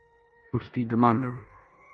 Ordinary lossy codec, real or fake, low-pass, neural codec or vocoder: Opus, 64 kbps; fake; 10.8 kHz; codec, 16 kHz in and 24 kHz out, 0.9 kbps, LongCat-Audio-Codec, four codebook decoder